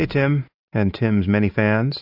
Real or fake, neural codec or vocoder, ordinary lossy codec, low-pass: real; none; AAC, 48 kbps; 5.4 kHz